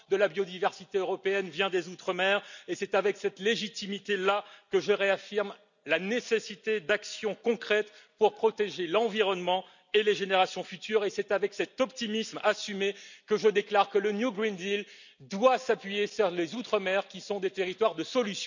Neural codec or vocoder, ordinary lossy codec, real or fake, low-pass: none; none; real; 7.2 kHz